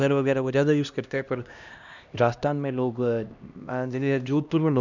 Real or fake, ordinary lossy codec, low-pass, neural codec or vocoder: fake; none; 7.2 kHz; codec, 16 kHz, 1 kbps, X-Codec, HuBERT features, trained on LibriSpeech